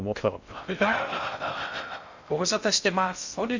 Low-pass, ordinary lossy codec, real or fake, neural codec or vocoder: 7.2 kHz; MP3, 64 kbps; fake; codec, 16 kHz in and 24 kHz out, 0.6 kbps, FocalCodec, streaming, 2048 codes